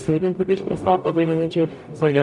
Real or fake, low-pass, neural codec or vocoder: fake; 10.8 kHz; codec, 44.1 kHz, 0.9 kbps, DAC